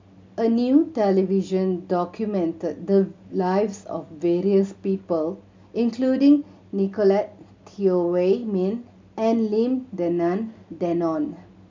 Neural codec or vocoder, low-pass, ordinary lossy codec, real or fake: none; 7.2 kHz; MP3, 64 kbps; real